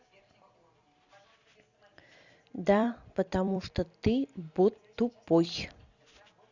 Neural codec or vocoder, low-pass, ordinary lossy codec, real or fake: vocoder, 44.1 kHz, 128 mel bands every 256 samples, BigVGAN v2; 7.2 kHz; Opus, 64 kbps; fake